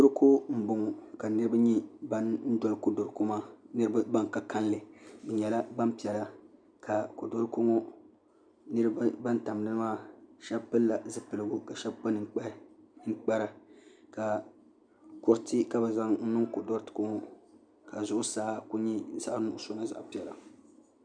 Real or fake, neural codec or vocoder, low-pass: real; none; 9.9 kHz